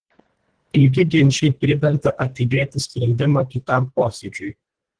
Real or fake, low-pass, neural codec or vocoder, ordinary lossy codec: fake; 9.9 kHz; codec, 24 kHz, 1.5 kbps, HILCodec; Opus, 16 kbps